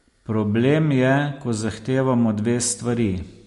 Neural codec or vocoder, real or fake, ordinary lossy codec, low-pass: none; real; MP3, 48 kbps; 14.4 kHz